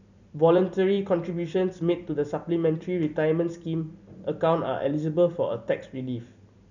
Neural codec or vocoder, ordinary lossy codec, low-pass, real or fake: vocoder, 44.1 kHz, 128 mel bands every 256 samples, BigVGAN v2; none; 7.2 kHz; fake